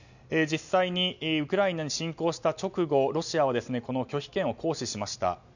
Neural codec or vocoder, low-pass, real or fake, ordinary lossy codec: none; 7.2 kHz; real; none